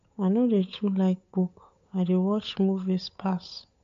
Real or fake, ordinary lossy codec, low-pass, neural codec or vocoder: fake; MP3, 48 kbps; 7.2 kHz; codec, 16 kHz, 8 kbps, FunCodec, trained on Chinese and English, 25 frames a second